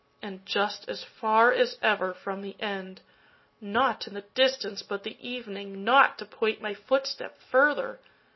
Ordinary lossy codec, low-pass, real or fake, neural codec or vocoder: MP3, 24 kbps; 7.2 kHz; real; none